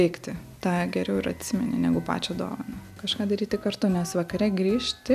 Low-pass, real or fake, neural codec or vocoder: 14.4 kHz; real; none